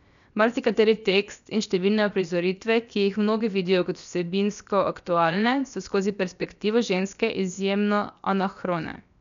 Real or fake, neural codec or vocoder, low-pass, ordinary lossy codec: fake; codec, 16 kHz, 0.7 kbps, FocalCodec; 7.2 kHz; none